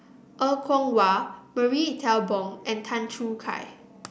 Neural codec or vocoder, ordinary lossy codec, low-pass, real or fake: none; none; none; real